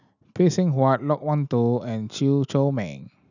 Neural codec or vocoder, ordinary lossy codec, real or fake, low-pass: none; none; real; 7.2 kHz